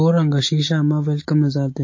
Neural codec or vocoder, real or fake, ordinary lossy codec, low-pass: none; real; MP3, 32 kbps; 7.2 kHz